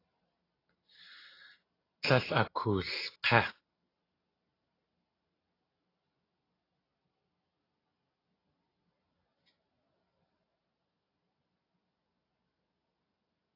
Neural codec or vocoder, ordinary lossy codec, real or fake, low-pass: none; AAC, 24 kbps; real; 5.4 kHz